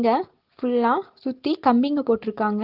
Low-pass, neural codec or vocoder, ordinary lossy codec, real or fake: 5.4 kHz; none; Opus, 16 kbps; real